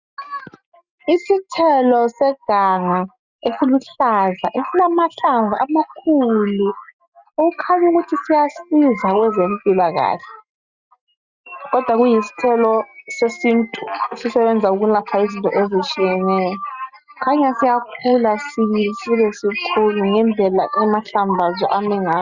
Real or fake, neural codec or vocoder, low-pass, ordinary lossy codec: real; none; 7.2 kHz; Opus, 64 kbps